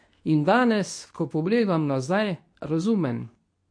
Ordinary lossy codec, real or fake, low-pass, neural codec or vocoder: MP3, 48 kbps; fake; 9.9 kHz; codec, 24 kHz, 0.9 kbps, WavTokenizer, medium speech release version 2